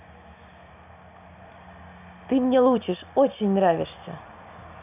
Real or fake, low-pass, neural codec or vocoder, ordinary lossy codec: real; 3.6 kHz; none; none